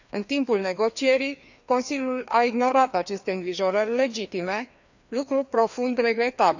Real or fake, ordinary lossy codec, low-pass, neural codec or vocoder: fake; none; 7.2 kHz; codec, 16 kHz, 2 kbps, FreqCodec, larger model